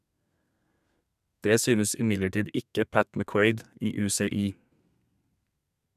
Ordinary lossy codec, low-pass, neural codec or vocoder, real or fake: none; 14.4 kHz; codec, 32 kHz, 1.9 kbps, SNAC; fake